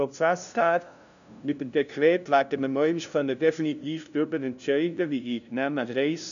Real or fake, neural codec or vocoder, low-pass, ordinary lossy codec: fake; codec, 16 kHz, 0.5 kbps, FunCodec, trained on LibriTTS, 25 frames a second; 7.2 kHz; none